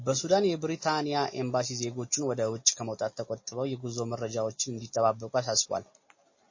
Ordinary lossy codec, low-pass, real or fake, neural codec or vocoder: MP3, 32 kbps; 7.2 kHz; real; none